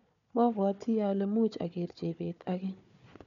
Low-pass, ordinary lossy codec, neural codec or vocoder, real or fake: 7.2 kHz; none; codec, 16 kHz, 4 kbps, FunCodec, trained on Chinese and English, 50 frames a second; fake